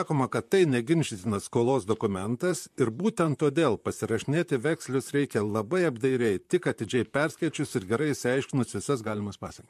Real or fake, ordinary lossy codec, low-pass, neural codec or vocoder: fake; MP3, 64 kbps; 14.4 kHz; vocoder, 44.1 kHz, 128 mel bands, Pupu-Vocoder